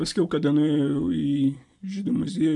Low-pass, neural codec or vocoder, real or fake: 10.8 kHz; none; real